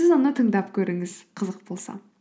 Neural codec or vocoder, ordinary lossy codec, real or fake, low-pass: none; none; real; none